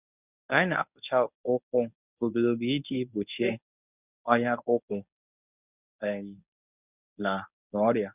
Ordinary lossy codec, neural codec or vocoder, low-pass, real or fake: none; codec, 24 kHz, 0.9 kbps, WavTokenizer, medium speech release version 1; 3.6 kHz; fake